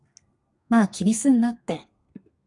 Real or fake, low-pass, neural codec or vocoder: fake; 10.8 kHz; codec, 32 kHz, 1.9 kbps, SNAC